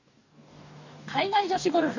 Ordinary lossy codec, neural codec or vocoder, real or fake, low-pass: AAC, 48 kbps; codec, 44.1 kHz, 2.6 kbps, DAC; fake; 7.2 kHz